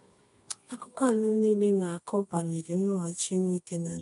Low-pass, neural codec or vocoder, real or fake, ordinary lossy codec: 10.8 kHz; codec, 24 kHz, 0.9 kbps, WavTokenizer, medium music audio release; fake; AAC, 48 kbps